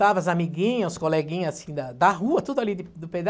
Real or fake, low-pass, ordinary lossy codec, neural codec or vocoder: real; none; none; none